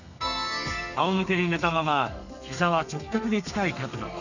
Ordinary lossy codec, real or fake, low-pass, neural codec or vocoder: none; fake; 7.2 kHz; codec, 32 kHz, 1.9 kbps, SNAC